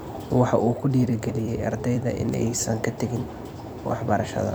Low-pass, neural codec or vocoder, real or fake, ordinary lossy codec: none; vocoder, 44.1 kHz, 128 mel bands every 256 samples, BigVGAN v2; fake; none